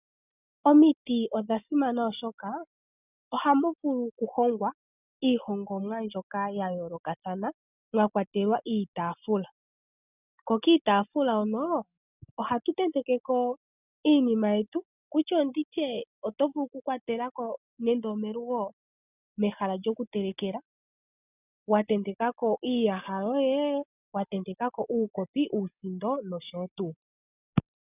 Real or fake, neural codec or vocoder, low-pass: real; none; 3.6 kHz